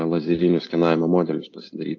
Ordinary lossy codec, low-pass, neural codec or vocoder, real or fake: AAC, 48 kbps; 7.2 kHz; none; real